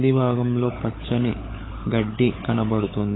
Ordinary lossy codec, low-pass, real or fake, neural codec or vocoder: AAC, 16 kbps; 7.2 kHz; fake; codec, 16 kHz, 16 kbps, FunCodec, trained on LibriTTS, 50 frames a second